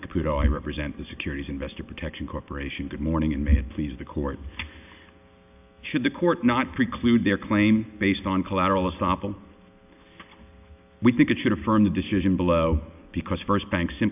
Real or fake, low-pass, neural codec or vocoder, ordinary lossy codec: real; 3.6 kHz; none; AAC, 32 kbps